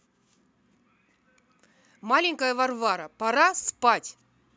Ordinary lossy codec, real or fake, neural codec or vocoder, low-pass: none; real; none; none